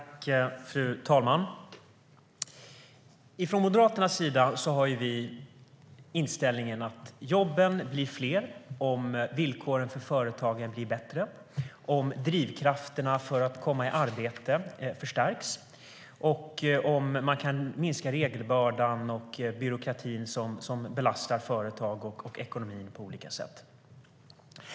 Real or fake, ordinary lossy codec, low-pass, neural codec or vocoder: real; none; none; none